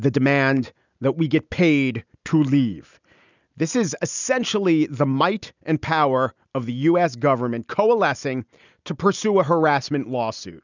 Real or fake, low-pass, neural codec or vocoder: real; 7.2 kHz; none